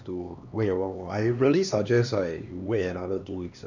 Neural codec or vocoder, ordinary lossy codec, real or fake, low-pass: codec, 16 kHz, 2 kbps, X-Codec, HuBERT features, trained on LibriSpeech; none; fake; 7.2 kHz